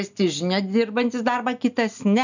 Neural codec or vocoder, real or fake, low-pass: none; real; 7.2 kHz